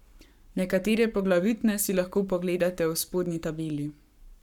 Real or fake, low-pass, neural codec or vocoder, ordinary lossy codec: fake; 19.8 kHz; codec, 44.1 kHz, 7.8 kbps, Pupu-Codec; none